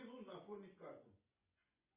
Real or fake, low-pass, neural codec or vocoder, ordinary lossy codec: fake; 3.6 kHz; vocoder, 24 kHz, 100 mel bands, Vocos; Opus, 64 kbps